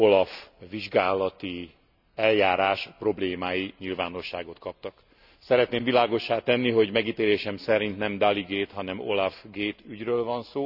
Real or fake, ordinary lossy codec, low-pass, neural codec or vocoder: real; none; 5.4 kHz; none